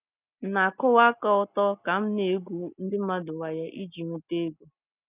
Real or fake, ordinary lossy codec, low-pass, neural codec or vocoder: real; none; 3.6 kHz; none